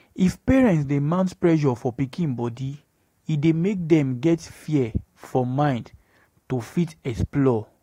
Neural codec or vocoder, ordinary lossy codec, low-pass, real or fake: none; AAC, 48 kbps; 19.8 kHz; real